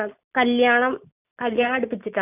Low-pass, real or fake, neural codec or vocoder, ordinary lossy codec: 3.6 kHz; real; none; none